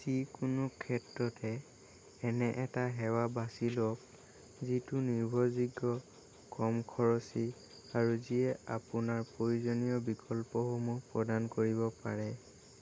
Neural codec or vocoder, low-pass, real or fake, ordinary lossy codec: none; none; real; none